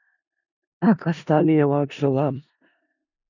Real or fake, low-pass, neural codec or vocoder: fake; 7.2 kHz; codec, 16 kHz in and 24 kHz out, 0.4 kbps, LongCat-Audio-Codec, four codebook decoder